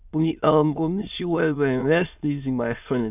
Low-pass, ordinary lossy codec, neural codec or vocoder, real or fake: 3.6 kHz; none; autoencoder, 22.05 kHz, a latent of 192 numbers a frame, VITS, trained on many speakers; fake